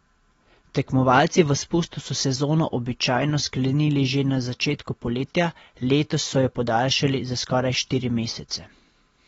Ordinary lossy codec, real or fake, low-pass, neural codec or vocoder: AAC, 24 kbps; real; 19.8 kHz; none